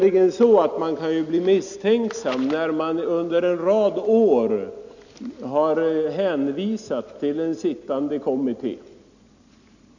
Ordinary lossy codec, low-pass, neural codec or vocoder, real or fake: none; 7.2 kHz; none; real